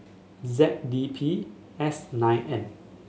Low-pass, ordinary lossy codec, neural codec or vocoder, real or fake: none; none; none; real